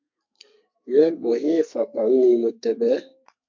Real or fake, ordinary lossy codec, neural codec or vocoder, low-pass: fake; MP3, 48 kbps; codec, 32 kHz, 1.9 kbps, SNAC; 7.2 kHz